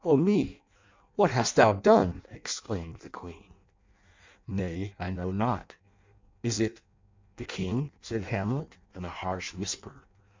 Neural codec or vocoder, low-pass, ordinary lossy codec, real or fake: codec, 16 kHz in and 24 kHz out, 0.6 kbps, FireRedTTS-2 codec; 7.2 kHz; MP3, 64 kbps; fake